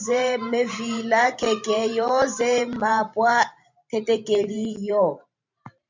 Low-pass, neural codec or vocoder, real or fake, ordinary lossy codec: 7.2 kHz; vocoder, 44.1 kHz, 128 mel bands every 512 samples, BigVGAN v2; fake; MP3, 64 kbps